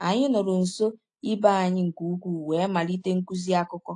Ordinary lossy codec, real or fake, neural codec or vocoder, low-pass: AAC, 48 kbps; real; none; 9.9 kHz